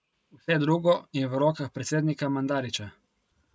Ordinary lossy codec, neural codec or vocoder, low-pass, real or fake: none; none; none; real